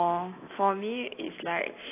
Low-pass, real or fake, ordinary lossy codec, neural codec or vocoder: 3.6 kHz; real; AAC, 24 kbps; none